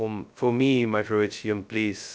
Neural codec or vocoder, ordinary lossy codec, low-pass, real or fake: codec, 16 kHz, 0.2 kbps, FocalCodec; none; none; fake